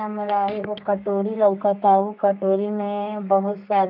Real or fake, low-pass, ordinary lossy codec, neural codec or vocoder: fake; 5.4 kHz; none; codec, 32 kHz, 1.9 kbps, SNAC